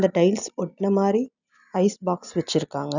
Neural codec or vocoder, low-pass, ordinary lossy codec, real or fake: none; 7.2 kHz; none; real